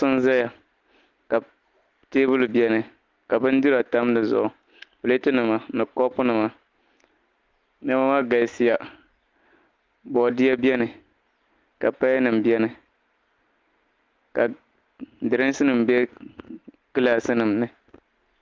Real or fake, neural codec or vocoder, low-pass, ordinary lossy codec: real; none; 7.2 kHz; Opus, 32 kbps